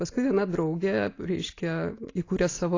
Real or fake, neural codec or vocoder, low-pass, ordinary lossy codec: real; none; 7.2 kHz; AAC, 32 kbps